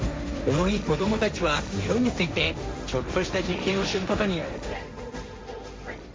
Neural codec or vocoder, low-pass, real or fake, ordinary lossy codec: codec, 16 kHz, 1.1 kbps, Voila-Tokenizer; none; fake; none